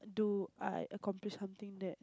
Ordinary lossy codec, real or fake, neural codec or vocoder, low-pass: none; real; none; none